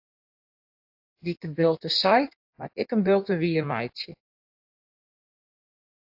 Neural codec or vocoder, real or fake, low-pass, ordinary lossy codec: codec, 16 kHz in and 24 kHz out, 1.1 kbps, FireRedTTS-2 codec; fake; 5.4 kHz; AAC, 48 kbps